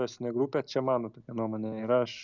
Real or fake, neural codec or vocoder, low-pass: real; none; 7.2 kHz